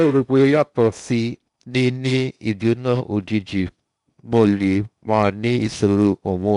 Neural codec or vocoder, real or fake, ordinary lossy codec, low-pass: codec, 16 kHz in and 24 kHz out, 0.8 kbps, FocalCodec, streaming, 65536 codes; fake; none; 10.8 kHz